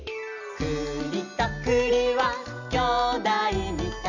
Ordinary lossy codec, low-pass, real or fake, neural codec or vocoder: none; 7.2 kHz; real; none